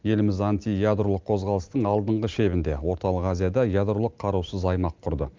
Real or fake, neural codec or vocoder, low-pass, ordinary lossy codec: real; none; 7.2 kHz; Opus, 32 kbps